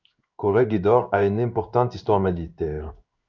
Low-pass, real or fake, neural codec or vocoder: 7.2 kHz; fake; codec, 16 kHz in and 24 kHz out, 1 kbps, XY-Tokenizer